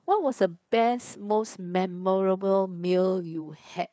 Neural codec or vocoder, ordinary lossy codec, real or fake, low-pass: codec, 16 kHz, 4 kbps, FreqCodec, larger model; none; fake; none